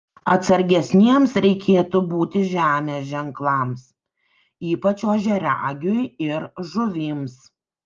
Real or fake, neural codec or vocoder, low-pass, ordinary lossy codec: real; none; 7.2 kHz; Opus, 24 kbps